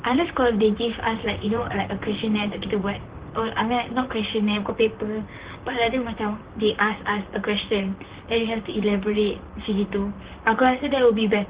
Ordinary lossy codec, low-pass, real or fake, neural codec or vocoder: Opus, 16 kbps; 3.6 kHz; fake; vocoder, 44.1 kHz, 128 mel bands, Pupu-Vocoder